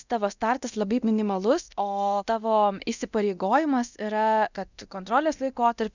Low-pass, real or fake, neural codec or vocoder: 7.2 kHz; fake; codec, 24 kHz, 0.9 kbps, DualCodec